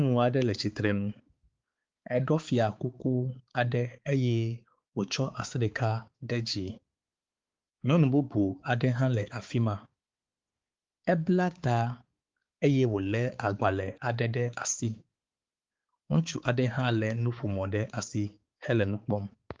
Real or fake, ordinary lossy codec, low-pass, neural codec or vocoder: fake; Opus, 32 kbps; 7.2 kHz; codec, 16 kHz, 4 kbps, X-Codec, HuBERT features, trained on balanced general audio